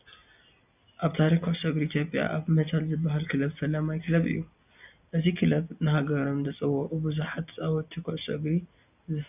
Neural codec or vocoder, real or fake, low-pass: none; real; 3.6 kHz